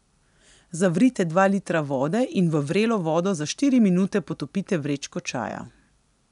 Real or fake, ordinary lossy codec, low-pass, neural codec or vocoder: real; none; 10.8 kHz; none